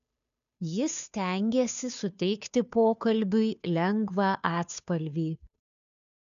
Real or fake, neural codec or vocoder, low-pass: fake; codec, 16 kHz, 2 kbps, FunCodec, trained on Chinese and English, 25 frames a second; 7.2 kHz